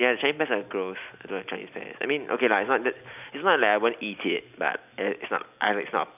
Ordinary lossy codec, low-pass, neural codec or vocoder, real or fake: none; 3.6 kHz; none; real